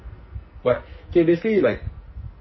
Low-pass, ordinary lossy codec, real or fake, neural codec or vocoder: 7.2 kHz; MP3, 24 kbps; fake; codec, 44.1 kHz, 2.6 kbps, SNAC